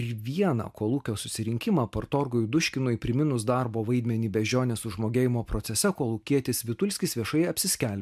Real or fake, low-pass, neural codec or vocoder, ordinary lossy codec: real; 14.4 kHz; none; MP3, 96 kbps